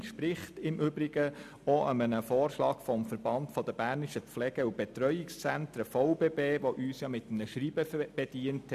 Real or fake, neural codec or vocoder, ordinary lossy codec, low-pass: real; none; none; 14.4 kHz